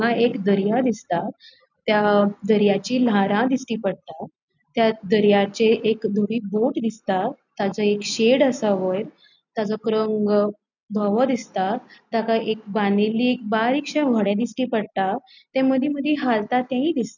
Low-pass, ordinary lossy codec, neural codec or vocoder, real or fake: 7.2 kHz; none; none; real